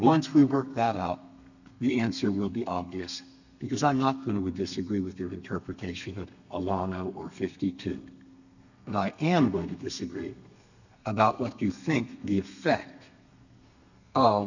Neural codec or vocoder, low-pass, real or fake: codec, 32 kHz, 1.9 kbps, SNAC; 7.2 kHz; fake